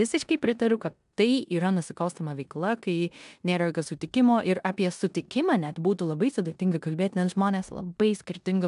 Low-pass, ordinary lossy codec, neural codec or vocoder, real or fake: 10.8 kHz; AAC, 96 kbps; codec, 16 kHz in and 24 kHz out, 0.9 kbps, LongCat-Audio-Codec, four codebook decoder; fake